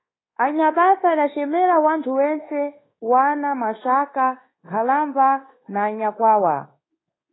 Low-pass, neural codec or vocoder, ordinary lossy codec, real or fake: 7.2 kHz; codec, 24 kHz, 1.2 kbps, DualCodec; AAC, 16 kbps; fake